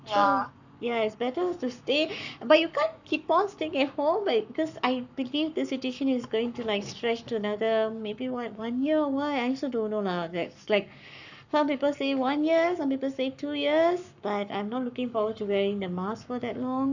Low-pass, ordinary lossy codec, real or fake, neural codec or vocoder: 7.2 kHz; none; fake; codec, 44.1 kHz, 7.8 kbps, Pupu-Codec